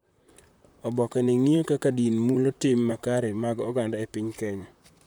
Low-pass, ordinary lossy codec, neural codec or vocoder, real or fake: none; none; vocoder, 44.1 kHz, 128 mel bands, Pupu-Vocoder; fake